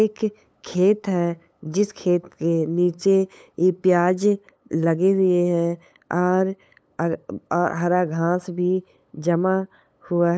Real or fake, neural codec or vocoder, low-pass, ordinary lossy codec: fake; codec, 16 kHz, 8 kbps, FunCodec, trained on LibriTTS, 25 frames a second; none; none